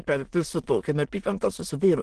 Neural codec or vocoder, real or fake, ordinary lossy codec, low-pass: autoencoder, 22.05 kHz, a latent of 192 numbers a frame, VITS, trained on many speakers; fake; Opus, 16 kbps; 9.9 kHz